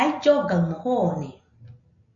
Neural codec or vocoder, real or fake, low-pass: none; real; 7.2 kHz